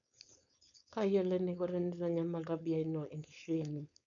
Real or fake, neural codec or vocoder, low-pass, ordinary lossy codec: fake; codec, 16 kHz, 4.8 kbps, FACodec; 7.2 kHz; none